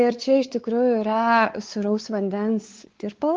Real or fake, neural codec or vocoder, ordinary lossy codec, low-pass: fake; codec, 16 kHz, 4 kbps, X-Codec, WavLM features, trained on Multilingual LibriSpeech; Opus, 16 kbps; 7.2 kHz